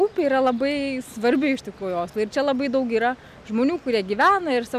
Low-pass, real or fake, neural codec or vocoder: 14.4 kHz; real; none